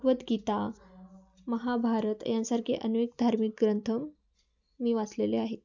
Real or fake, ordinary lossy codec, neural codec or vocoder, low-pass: real; none; none; 7.2 kHz